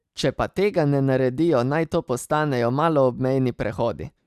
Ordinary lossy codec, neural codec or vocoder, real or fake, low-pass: Opus, 64 kbps; none; real; 14.4 kHz